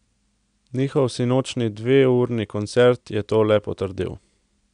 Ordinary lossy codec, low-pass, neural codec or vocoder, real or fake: none; 9.9 kHz; none; real